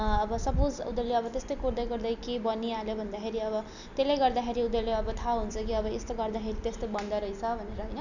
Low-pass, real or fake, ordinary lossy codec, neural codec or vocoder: 7.2 kHz; real; none; none